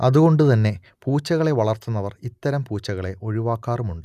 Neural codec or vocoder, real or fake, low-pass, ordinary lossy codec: none; real; 14.4 kHz; none